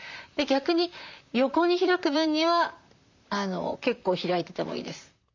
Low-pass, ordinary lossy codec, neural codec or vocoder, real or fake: 7.2 kHz; MP3, 64 kbps; vocoder, 44.1 kHz, 128 mel bands, Pupu-Vocoder; fake